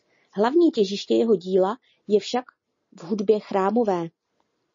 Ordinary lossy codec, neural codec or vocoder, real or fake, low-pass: MP3, 32 kbps; none; real; 7.2 kHz